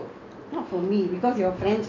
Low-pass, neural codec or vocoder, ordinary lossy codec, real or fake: 7.2 kHz; none; MP3, 48 kbps; real